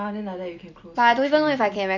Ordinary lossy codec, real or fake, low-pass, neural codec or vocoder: MP3, 64 kbps; real; 7.2 kHz; none